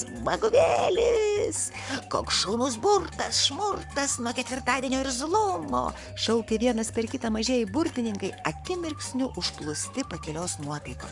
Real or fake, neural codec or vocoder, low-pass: fake; codec, 44.1 kHz, 7.8 kbps, Pupu-Codec; 10.8 kHz